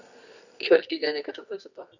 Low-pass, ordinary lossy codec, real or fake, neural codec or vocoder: 7.2 kHz; none; fake; codec, 24 kHz, 0.9 kbps, WavTokenizer, medium music audio release